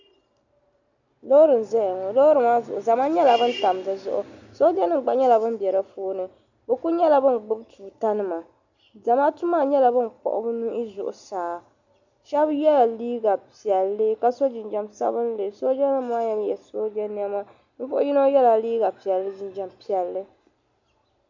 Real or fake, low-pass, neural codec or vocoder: real; 7.2 kHz; none